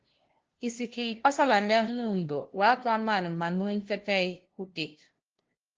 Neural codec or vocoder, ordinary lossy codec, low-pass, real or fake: codec, 16 kHz, 0.5 kbps, FunCodec, trained on LibriTTS, 25 frames a second; Opus, 16 kbps; 7.2 kHz; fake